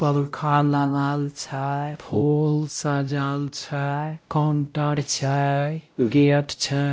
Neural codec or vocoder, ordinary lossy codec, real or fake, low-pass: codec, 16 kHz, 0.5 kbps, X-Codec, WavLM features, trained on Multilingual LibriSpeech; none; fake; none